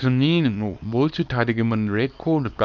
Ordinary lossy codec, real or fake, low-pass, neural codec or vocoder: none; fake; 7.2 kHz; codec, 24 kHz, 0.9 kbps, WavTokenizer, small release